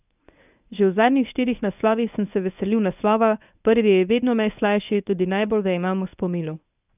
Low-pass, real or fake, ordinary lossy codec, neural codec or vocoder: 3.6 kHz; fake; none; codec, 24 kHz, 0.9 kbps, WavTokenizer, medium speech release version 1